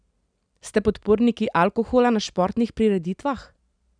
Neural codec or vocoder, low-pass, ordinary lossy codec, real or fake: none; 9.9 kHz; none; real